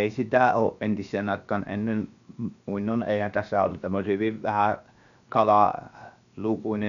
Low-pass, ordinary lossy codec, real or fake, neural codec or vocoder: 7.2 kHz; none; fake; codec, 16 kHz, 0.7 kbps, FocalCodec